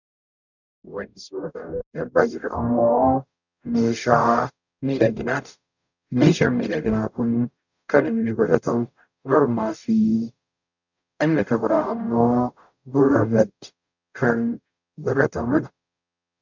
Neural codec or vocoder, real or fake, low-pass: codec, 44.1 kHz, 0.9 kbps, DAC; fake; 7.2 kHz